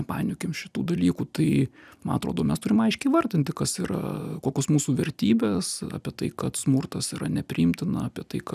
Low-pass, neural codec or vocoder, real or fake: 14.4 kHz; none; real